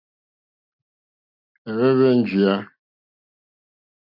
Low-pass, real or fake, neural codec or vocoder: 5.4 kHz; real; none